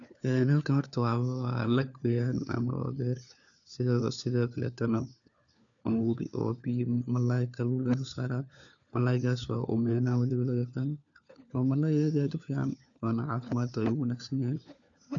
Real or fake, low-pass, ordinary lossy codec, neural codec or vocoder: fake; 7.2 kHz; none; codec, 16 kHz, 4 kbps, FunCodec, trained on LibriTTS, 50 frames a second